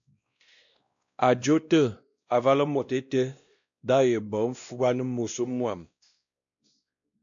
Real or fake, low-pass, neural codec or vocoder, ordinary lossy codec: fake; 7.2 kHz; codec, 16 kHz, 1 kbps, X-Codec, WavLM features, trained on Multilingual LibriSpeech; AAC, 64 kbps